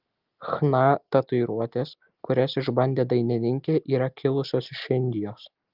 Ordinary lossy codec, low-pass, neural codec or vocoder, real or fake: Opus, 24 kbps; 5.4 kHz; none; real